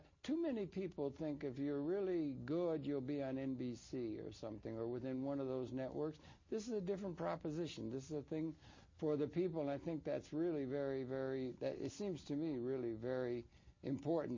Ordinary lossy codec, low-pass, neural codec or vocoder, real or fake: MP3, 32 kbps; 7.2 kHz; none; real